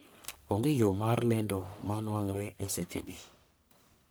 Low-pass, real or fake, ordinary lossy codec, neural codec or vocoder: none; fake; none; codec, 44.1 kHz, 1.7 kbps, Pupu-Codec